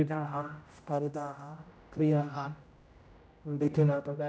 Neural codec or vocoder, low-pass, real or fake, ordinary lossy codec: codec, 16 kHz, 0.5 kbps, X-Codec, HuBERT features, trained on general audio; none; fake; none